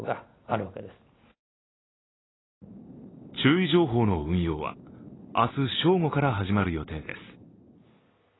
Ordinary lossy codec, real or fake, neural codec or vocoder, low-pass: AAC, 16 kbps; real; none; 7.2 kHz